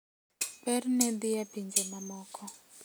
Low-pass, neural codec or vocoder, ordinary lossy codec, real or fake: none; none; none; real